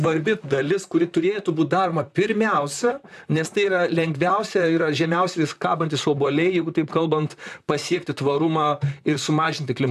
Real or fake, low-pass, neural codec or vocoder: fake; 14.4 kHz; vocoder, 44.1 kHz, 128 mel bands, Pupu-Vocoder